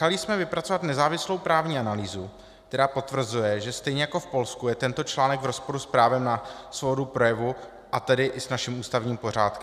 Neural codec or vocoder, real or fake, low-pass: none; real; 14.4 kHz